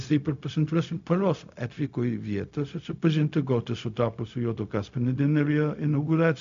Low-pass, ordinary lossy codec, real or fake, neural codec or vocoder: 7.2 kHz; MP3, 64 kbps; fake; codec, 16 kHz, 0.4 kbps, LongCat-Audio-Codec